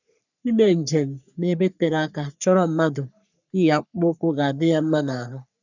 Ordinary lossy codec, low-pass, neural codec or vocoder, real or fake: none; 7.2 kHz; codec, 44.1 kHz, 3.4 kbps, Pupu-Codec; fake